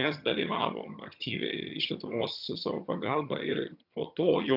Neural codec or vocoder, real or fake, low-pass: vocoder, 22.05 kHz, 80 mel bands, HiFi-GAN; fake; 5.4 kHz